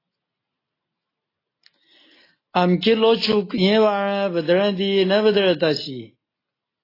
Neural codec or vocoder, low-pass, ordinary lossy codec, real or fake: none; 5.4 kHz; AAC, 24 kbps; real